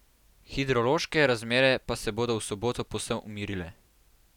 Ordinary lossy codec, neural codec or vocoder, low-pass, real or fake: none; none; 19.8 kHz; real